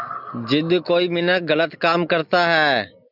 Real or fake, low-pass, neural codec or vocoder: real; 5.4 kHz; none